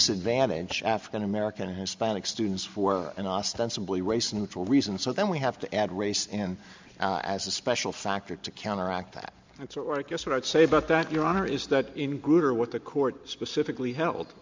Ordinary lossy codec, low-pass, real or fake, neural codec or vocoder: MP3, 64 kbps; 7.2 kHz; real; none